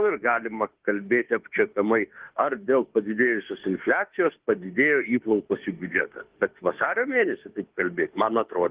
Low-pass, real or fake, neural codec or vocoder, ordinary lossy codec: 3.6 kHz; fake; autoencoder, 48 kHz, 32 numbers a frame, DAC-VAE, trained on Japanese speech; Opus, 16 kbps